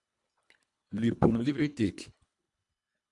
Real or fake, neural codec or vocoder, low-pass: fake; codec, 24 kHz, 1.5 kbps, HILCodec; 10.8 kHz